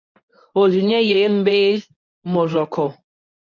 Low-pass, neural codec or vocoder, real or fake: 7.2 kHz; codec, 24 kHz, 0.9 kbps, WavTokenizer, medium speech release version 1; fake